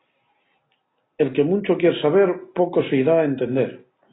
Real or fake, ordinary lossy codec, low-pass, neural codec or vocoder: real; AAC, 16 kbps; 7.2 kHz; none